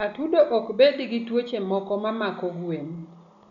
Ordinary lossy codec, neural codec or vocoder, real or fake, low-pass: none; none; real; 7.2 kHz